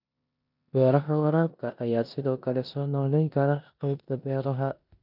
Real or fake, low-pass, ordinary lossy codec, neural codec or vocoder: fake; 5.4 kHz; none; codec, 16 kHz in and 24 kHz out, 0.9 kbps, LongCat-Audio-Codec, four codebook decoder